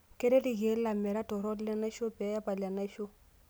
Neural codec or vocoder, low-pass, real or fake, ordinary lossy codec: none; none; real; none